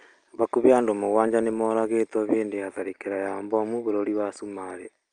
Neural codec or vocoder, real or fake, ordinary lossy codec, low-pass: none; real; Opus, 32 kbps; 9.9 kHz